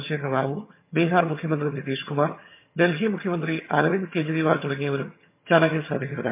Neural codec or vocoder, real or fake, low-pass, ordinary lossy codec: vocoder, 22.05 kHz, 80 mel bands, HiFi-GAN; fake; 3.6 kHz; MP3, 24 kbps